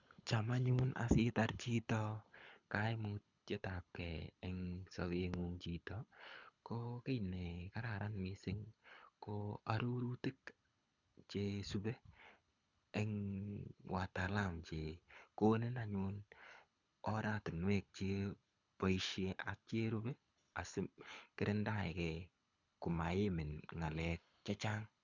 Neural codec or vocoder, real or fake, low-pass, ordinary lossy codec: codec, 24 kHz, 6 kbps, HILCodec; fake; 7.2 kHz; none